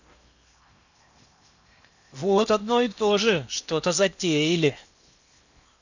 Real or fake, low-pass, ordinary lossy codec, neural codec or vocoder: fake; 7.2 kHz; none; codec, 16 kHz in and 24 kHz out, 0.8 kbps, FocalCodec, streaming, 65536 codes